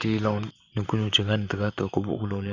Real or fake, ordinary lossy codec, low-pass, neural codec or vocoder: fake; none; 7.2 kHz; vocoder, 44.1 kHz, 128 mel bands, Pupu-Vocoder